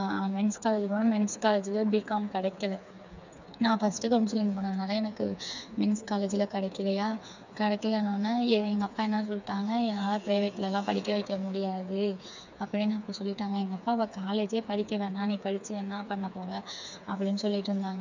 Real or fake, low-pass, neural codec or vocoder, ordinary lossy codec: fake; 7.2 kHz; codec, 16 kHz, 4 kbps, FreqCodec, smaller model; none